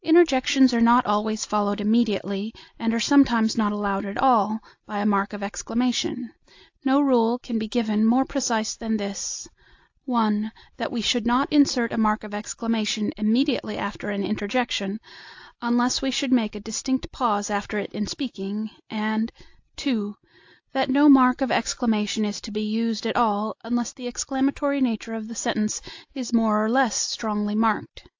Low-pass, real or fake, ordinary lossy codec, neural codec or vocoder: 7.2 kHz; real; AAC, 48 kbps; none